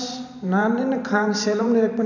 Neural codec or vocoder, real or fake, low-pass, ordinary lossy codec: vocoder, 44.1 kHz, 128 mel bands every 512 samples, BigVGAN v2; fake; 7.2 kHz; none